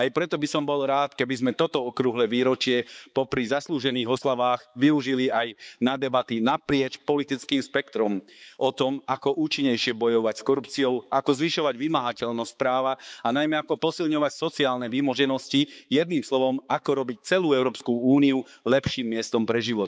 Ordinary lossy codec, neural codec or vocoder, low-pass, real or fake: none; codec, 16 kHz, 4 kbps, X-Codec, HuBERT features, trained on balanced general audio; none; fake